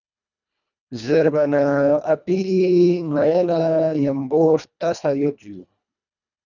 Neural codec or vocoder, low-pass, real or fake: codec, 24 kHz, 1.5 kbps, HILCodec; 7.2 kHz; fake